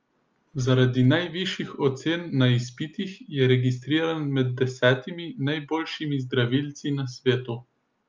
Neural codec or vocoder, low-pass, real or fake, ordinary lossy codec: none; 7.2 kHz; real; Opus, 24 kbps